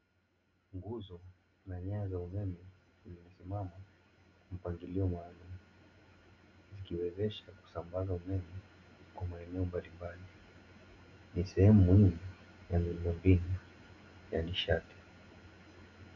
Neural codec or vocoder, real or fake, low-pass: none; real; 7.2 kHz